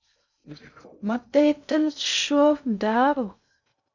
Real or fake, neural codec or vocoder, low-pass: fake; codec, 16 kHz in and 24 kHz out, 0.6 kbps, FocalCodec, streaming, 2048 codes; 7.2 kHz